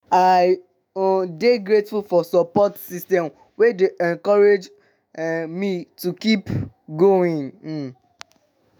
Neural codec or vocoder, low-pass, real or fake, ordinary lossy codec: autoencoder, 48 kHz, 128 numbers a frame, DAC-VAE, trained on Japanese speech; none; fake; none